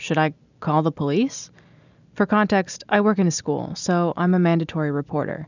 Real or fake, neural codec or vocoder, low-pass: real; none; 7.2 kHz